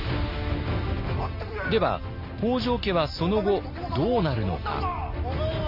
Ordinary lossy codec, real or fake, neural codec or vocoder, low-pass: Opus, 64 kbps; real; none; 5.4 kHz